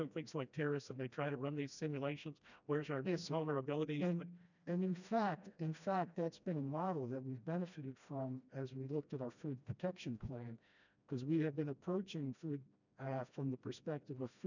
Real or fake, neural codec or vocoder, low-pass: fake; codec, 16 kHz, 1 kbps, FreqCodec, smaller model; 7.2 kHz